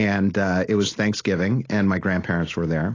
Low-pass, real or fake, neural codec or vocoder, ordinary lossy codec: 7.2 kHz; real; none; AAC, 32 kbps